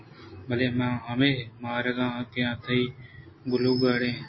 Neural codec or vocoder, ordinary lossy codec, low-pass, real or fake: none; MP3, 24 kbps; 7.2 kHz; real